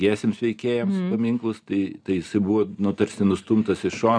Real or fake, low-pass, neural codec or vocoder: real; 9.9 kHz; none